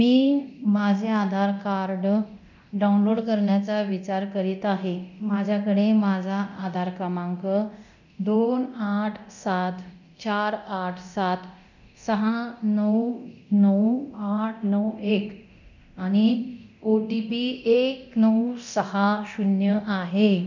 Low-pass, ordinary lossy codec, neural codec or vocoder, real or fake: 7.2 kHz; none; codec, 24 kHz, 0.9 kbps, DualCodec; fake